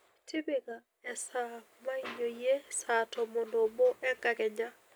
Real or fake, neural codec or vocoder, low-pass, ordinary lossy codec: real; none; none; none